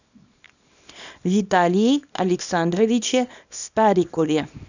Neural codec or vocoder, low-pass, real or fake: codec, 24 kHz, 0.9 kbps, WavTokenizer, small release; 7.2 kHz; fake